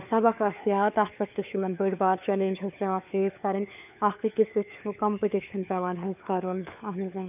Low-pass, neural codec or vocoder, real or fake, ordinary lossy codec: 3.6 kHz; codec, 16 kHz, 4 kbps, FunCodec, trained on LibriTTS, 50 frames a second; fake; none